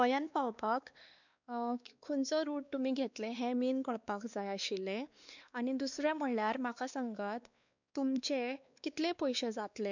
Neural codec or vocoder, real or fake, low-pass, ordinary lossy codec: codec, 16 kHz, 2 kbps, X-Codec, WavLM features, trained on Multilingual LibriSpeech; fake; 7.2 kHz; none